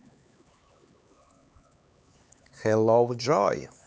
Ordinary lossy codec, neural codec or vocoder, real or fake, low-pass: none; codec, 16 kHz, 4 kbps, X-Codec, HuBERT features, trained on LibriSpeech; fake; none